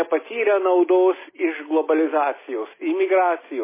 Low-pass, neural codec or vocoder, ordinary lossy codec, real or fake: 3.6 kHz; none; MP3, 16 kbps; real